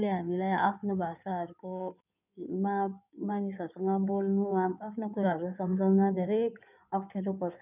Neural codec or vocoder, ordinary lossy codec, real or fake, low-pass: codec, 16 kHz in and 24 kHz out, 2.2 kbps, FireRedTTS-2 codec; none; fake; 3.6 kHz